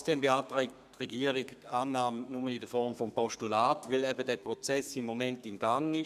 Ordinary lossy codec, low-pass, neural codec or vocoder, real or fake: none; 14.4 kHz; codec, 32 kHz, 1.9 kbps, SNAC; fake